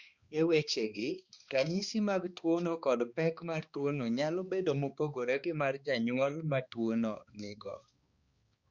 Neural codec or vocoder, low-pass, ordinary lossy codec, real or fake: codec, 16 kHz, 2 kbps, X-Codec, HuBERT features, trained on balanced general audio; 7.2 kHz; Opus, 64 kbps; fake